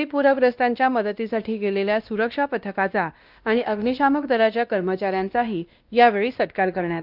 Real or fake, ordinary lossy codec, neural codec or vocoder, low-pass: fake; Opus, 24 kbps; codec, 16 kHz, 1 kbps, X-Codec, WavLM features, trained on Multilingual LibriSpeech; 5.4 kHz